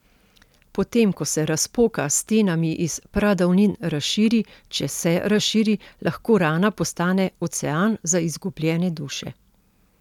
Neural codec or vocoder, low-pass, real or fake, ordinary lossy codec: none; 19.8 kHz; real; none